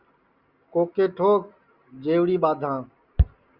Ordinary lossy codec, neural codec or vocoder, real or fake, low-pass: Opus, 64 kbps; none; real; 5.4 kHz